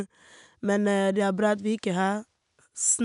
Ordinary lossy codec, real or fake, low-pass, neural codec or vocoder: none; real; 10.8 kHz; none